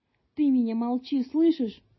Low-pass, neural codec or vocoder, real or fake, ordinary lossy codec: 7.2 kHz; none; real; MP3, 24 kbps